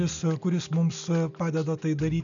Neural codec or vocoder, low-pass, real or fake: none; 7.2 kHz; real